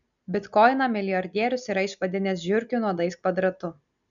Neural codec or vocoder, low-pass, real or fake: none; 7.2 kHz; real